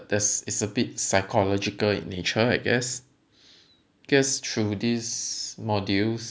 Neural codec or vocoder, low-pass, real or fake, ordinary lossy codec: none; none; real; none